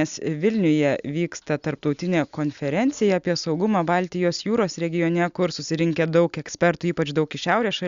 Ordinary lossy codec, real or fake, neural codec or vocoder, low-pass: Opus, 64 kbps; real; none; 7.2 kHz